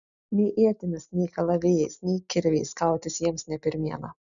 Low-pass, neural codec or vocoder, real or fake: 7.2 kHz; none; real